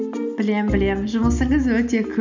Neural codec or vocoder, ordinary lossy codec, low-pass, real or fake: none; none; 7.2 kHz; real